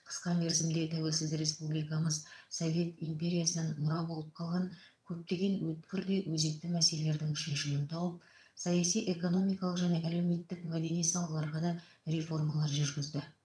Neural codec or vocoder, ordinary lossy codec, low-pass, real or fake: vocoder, 22.05 kHz, 80 mel bands, HiFi-GAN; none; none; fake